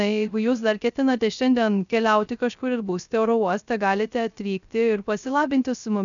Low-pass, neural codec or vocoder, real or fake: 7.2 kHz; codec, 16 kHz, 0.3 kbps, FocalCodec; fake